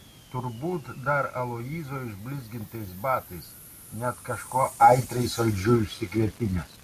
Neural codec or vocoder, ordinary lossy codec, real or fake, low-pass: none; MP3, 64 kbps; real; 14.4 kHz